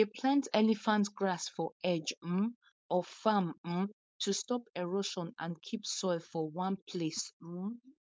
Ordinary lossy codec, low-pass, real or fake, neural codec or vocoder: none; none; fake; codec, 16 kHz, 4.8 kbps, FACodec